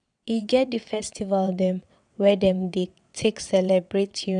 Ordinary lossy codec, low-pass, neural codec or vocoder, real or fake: MP3, 96 kbps; 9.9 kHz; vocoder, 22.05 kHz, 80 mel bands, WaveNeXt; fake